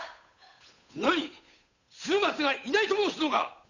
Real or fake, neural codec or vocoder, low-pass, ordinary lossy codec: fake; codec, 16 kHz, 8 kbps, FunCodec, trained on Chinese and English, 25 frames a second; 7.2 kHz; none